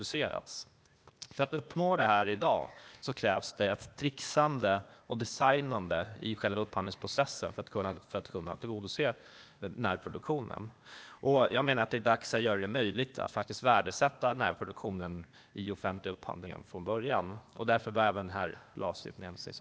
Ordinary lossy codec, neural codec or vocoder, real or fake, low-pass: none; codec, 16 kHz, 0.8 kbps, ZipCodec; fake; none